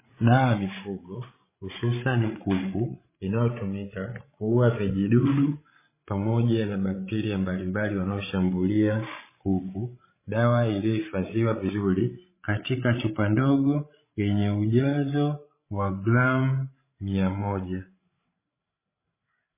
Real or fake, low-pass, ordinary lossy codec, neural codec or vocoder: fake; 3.6 kHz; MP3, 16 kbps; codec, 16 kHz, 16 kbps, FreqCodec, larger model